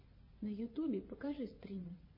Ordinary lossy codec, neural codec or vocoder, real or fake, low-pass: MP3, 24 kbps; vocoder, 22.05 kHz, 80 mel bands, WaveNeXt; fake; 5.4 kHz